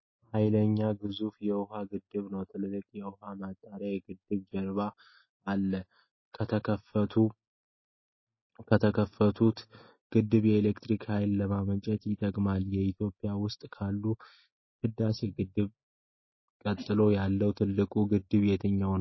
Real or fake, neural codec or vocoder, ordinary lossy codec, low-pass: real; none; MP3, 24 kbps; 7.2 kHz